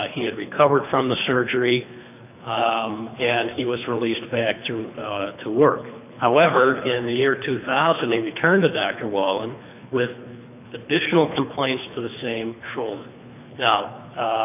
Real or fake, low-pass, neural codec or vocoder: fake; 3.6 kHz; codec, 24 kHz, 3 kbps, HILCodec